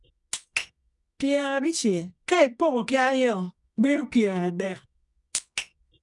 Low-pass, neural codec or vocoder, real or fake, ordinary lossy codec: 10.8 kHz; codec, 24 kHz, 0.9 kbps, WavTokenizer, medium music audio release; fake; none